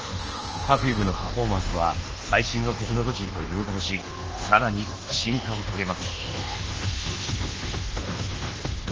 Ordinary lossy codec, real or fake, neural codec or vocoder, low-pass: Opus, 16 kbps; fake; codec, 24 kHz, 1.2 kbps, DualCodec; 7.2 kHz